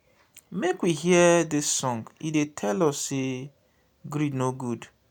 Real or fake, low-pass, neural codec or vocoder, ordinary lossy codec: real; 19.8 kHz; none; none